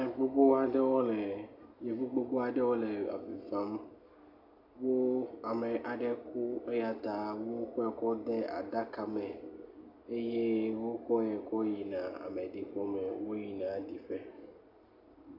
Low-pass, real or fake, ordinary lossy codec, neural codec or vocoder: 5.4 kHz; real; AAC, 48 kbps; none